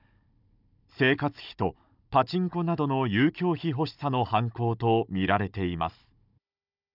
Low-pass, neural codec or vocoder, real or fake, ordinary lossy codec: 5.4 kHz; codec, 16 kHz, 16 kbps, FunCodec, trained on Chinese and English, 50 frames a second; fake; none